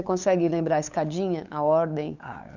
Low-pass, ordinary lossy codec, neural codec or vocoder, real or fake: 7.2 kHz; none; codec, 16 kHz, 8 kbps, FunCodec, trained on LibriTTS, 25 frames a second; fake